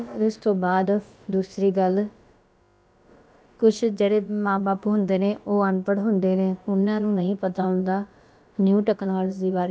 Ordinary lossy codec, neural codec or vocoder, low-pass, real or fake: none; codec, 16 kHz, about 1 kbps, DyCAST, with the encoder's durations; none; fake